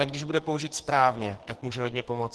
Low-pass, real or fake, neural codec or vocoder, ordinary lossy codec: 10.8 kHz; fake; codec, 44.1 kHz, 2.6 kbps, SNAC; Opus, 16 kbps